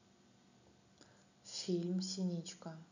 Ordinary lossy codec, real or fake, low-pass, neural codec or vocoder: none; real; 7.2 kHz; none